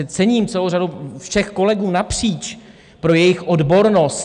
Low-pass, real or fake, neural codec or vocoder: 9.9 kHz; real; none